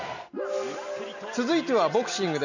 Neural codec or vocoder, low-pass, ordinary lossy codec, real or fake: none; 7.2 kHz; none; real